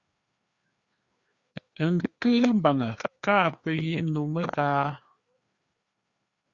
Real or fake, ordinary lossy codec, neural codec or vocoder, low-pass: fake; Opus, 64 kbps; codec, 16 kHz, 2 kbps, FreqCodec, larger model; 7.2 kHz